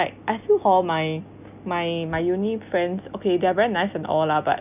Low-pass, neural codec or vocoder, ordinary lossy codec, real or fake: 3.6 kHz; none; none; real